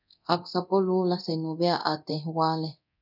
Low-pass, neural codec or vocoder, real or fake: 5.4 kHz; codec, 24 kHz, 0.9 kbps, DualCodec; fake